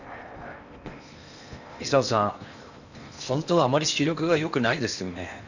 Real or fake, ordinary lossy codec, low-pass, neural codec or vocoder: fake; none; 7.2 kHz; codec, 16 kHz in and 24 kHz out, 0.8 kbps, FocalCodec, streaming, 65536 codes